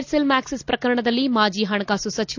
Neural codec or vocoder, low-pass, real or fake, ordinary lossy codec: none; 7.2 kHz; real; AAC, 48 kbps